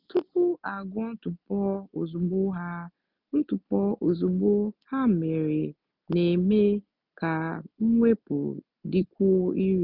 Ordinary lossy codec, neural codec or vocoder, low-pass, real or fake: none; none; 5.4 kHz; real